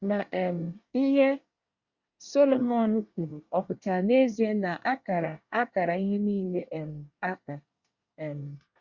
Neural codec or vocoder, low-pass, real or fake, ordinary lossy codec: codec, 24 kHz, 1 kbps, SNAC; 7.2 kHz; fake; Opus, 64 kbps